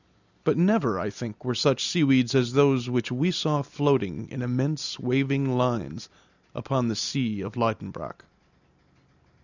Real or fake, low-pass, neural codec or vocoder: real; 7.2 kHz; none